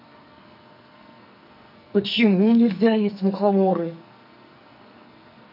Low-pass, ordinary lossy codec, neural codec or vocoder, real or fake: 5.4 kHz; none; codec, 44.1 kHz, 2.6 kbps, SNAC; fake